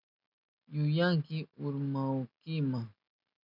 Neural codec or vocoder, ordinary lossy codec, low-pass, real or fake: none; MP3, 48 kbps; 5.4 kHz; real